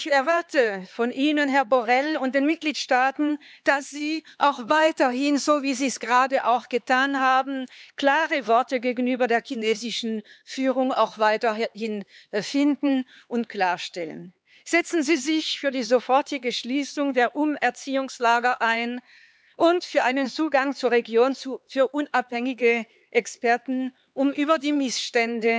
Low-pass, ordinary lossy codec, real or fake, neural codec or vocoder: none; none; fake; codec, 16 kHz, 4 kbps, X-Codec, HuBERT features, trained on LibriSpeech